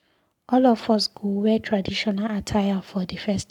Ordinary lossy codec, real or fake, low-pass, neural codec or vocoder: none; fake; 19.8 kHz; codec, 44.1 kHz, 7.8 kbps, Pupu-Codec